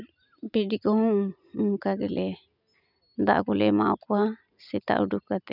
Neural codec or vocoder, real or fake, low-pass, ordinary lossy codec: none; real; 5.4 kHz; none